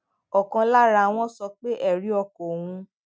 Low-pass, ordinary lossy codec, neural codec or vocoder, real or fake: none; none; none; real